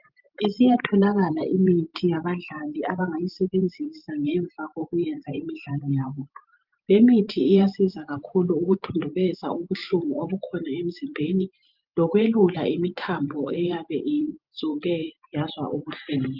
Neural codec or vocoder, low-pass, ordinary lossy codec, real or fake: vocoder, 44.1 kHz, 128 mel bands every 512 samples, BigVGAN v2; 5.4 kHz; Opus, 24 kbps; fake